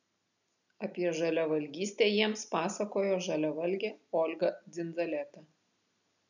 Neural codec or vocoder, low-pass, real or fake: none; 7.2 kHz; real